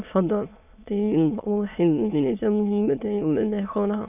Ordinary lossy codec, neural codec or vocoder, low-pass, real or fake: none; autoencoder, 22.05 kHz, a latent of 192 numbers a frame, VITS, trained on many speakers; 3.6 kHz; fake